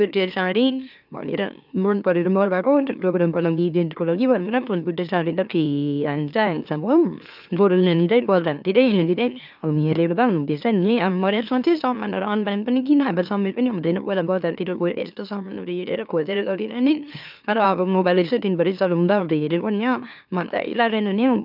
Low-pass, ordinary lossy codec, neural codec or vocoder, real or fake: 5.4 kHz; none; autoencoder, 44.1 kHz, a latent of 192 numbers a frame, MeloTTS; fake